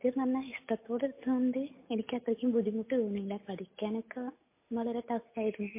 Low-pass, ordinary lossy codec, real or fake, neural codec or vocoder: 3.6 kHz; MP3, 24 kbps; real; none